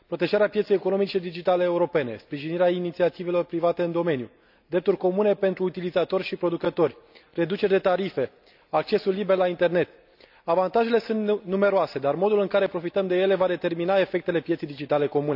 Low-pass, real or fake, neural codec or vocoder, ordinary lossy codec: 5.4 kHz; real; none; none